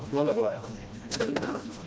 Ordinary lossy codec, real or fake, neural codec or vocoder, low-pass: none; fake; codec, 16 kHz, 1 kbps, FreqCodec, smaller model; none